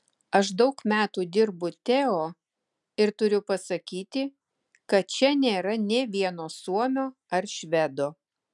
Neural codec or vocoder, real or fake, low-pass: none; real; 10.8 kHz